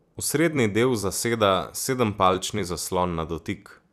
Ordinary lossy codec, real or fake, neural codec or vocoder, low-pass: none; fake; vocoder, 44.1 kHz, 128 mel bands every 256 samples, BigVGAN v2; 14.4 kHz